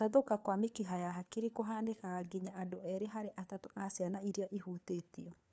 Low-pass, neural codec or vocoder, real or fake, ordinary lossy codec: none; codec, 16 kHz, 4 kbps, FunCodec, trained on LibriTTS, 50 frames a second; fake; none